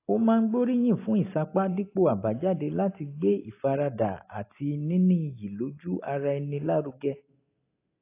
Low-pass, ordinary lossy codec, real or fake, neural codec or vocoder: 3.6 kHz; AAC, 24 kbps; real; none